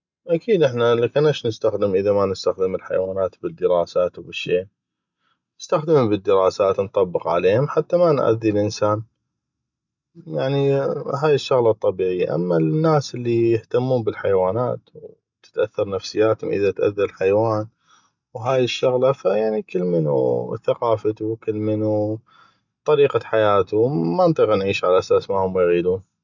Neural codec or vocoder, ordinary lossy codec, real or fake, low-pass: none; none; real; 7.2 kHz